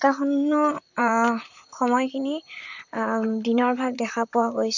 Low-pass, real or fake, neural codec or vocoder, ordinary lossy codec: 7.2 kHz; fake; vocoder, 44.1 kHz, 128 mel bands, Pupu-Vocoder; none